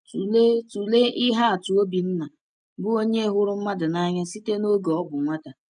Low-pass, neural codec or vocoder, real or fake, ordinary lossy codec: 9.9 kHz; none; real; none